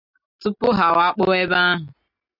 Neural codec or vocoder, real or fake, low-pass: none; real; 5.4 kHz